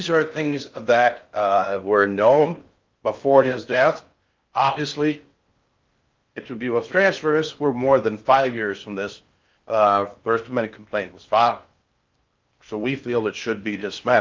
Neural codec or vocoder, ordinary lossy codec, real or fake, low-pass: codec, 16 kHz in and 24 kHz out, 0.6 kbps, FocalCodec, streaming, 4096 codes; Opus, 24 kbps; fake; 7.2 kHz